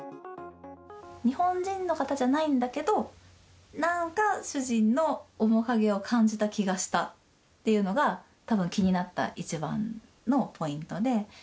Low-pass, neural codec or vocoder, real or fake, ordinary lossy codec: none; none; real; none